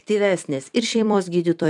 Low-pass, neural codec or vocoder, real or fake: 10.8 kHz; vocoder, 44.1 kHz, 128 mel bands every 256 samples, BigVGAN v2; fake